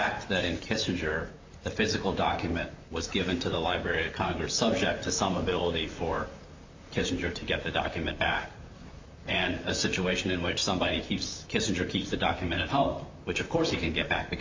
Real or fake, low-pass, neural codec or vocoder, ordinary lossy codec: fake; 7.2 kHz; vocoder, 44.1 kHz, 128 mel bands, Pupu-Vocoder; MP3, 48 kbps